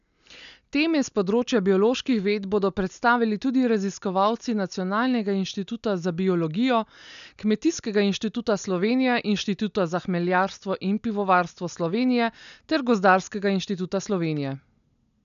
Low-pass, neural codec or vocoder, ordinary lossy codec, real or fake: 7.2 kHz; none; none; real